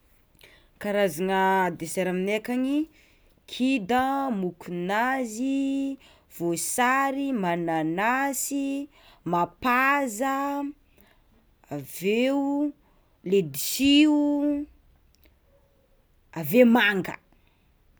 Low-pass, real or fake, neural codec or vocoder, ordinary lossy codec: none; real; none; none